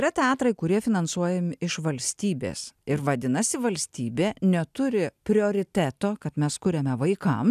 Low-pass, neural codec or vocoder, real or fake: 14.4 kHz; none; real